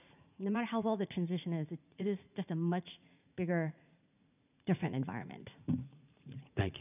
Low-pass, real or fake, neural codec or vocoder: 3.6 kHz; fake; vocoder, 44.1 kHz, 80 mel bands, Vocos